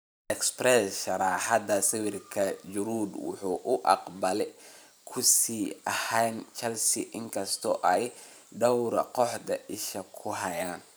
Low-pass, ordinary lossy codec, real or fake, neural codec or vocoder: none; none; fake; vocoder, 44.1 kHz, 128 mel bands every 512 samples, BigVGAN v2